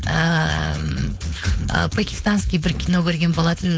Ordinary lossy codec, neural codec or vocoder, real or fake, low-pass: none; codec, 16 kHz, 4.8 kbps, FACodec; fake; none